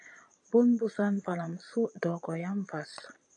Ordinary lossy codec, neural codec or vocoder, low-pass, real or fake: AAC, 64 kbps; vocoder, 22.05 kHz, 80 mel bands, Vocos; 9.9 kHz; fake